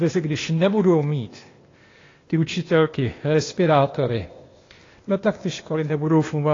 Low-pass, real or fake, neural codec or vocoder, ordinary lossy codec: 7.2 kHz; fake; codec, 16 kHz, 0.8 kbps, ZipCodec; AAC, 32 kbps